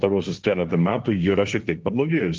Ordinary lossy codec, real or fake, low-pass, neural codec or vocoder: Opus, 32 kbps; fake; 7.2 kHz; codec, 16 kHz, 1.1 kbps, Voila-Tokenizer